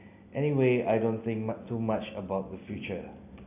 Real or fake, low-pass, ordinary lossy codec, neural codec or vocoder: real; 3.6 kHz; AAC, 32 kbps; none